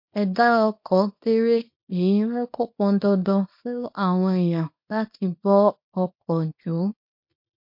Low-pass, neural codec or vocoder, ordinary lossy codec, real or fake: 5.4 kHz; codec, 24 kHz, 0.9 kbps, WavTokenizer, small release; MP3, 32 kbps; fake